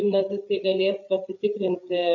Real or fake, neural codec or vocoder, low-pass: fake; codec, 16 kHz, 8 kbps, FreqCodec, larger model; 7.2 kHz